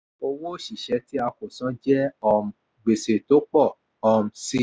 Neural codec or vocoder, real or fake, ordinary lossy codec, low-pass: none; real; none; none